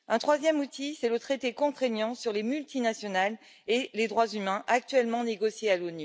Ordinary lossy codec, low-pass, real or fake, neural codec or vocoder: none; none; real; none